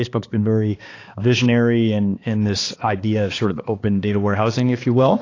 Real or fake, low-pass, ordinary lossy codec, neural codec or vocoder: fake; 7.2 kHz; AAC, 32 kbps; codec, 16 kHz, 2 kbps, X-Codec, HuBERT features, trained on balanced general audio